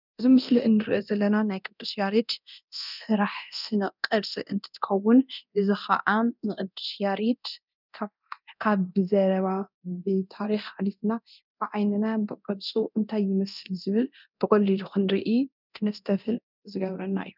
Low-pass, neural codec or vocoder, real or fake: 5.4 kHz; codec, 24 kHz, 0.9 kbps, DualCodec; fake